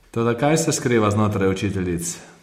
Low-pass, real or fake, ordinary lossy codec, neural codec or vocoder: 19.8 kHz; real; MP3, 64 kbps; none